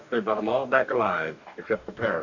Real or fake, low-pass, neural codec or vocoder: fake; 7.2 kHz; codec, 44.1 kHz, 2.6 kbps, DAC